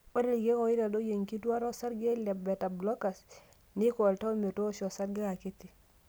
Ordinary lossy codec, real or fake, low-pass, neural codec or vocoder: none; real; none; none